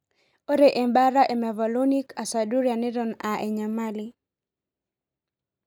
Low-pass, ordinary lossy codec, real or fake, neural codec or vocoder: 19.8 kHz; none; real; none